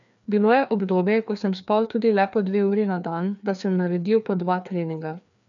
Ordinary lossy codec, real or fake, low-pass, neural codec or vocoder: none; fake; 7.2 kHz; codec, 16 kHz, 2 kbps, FreqCodec, larger model